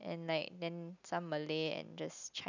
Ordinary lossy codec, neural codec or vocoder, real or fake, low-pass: none; none; real; 7.2 kHz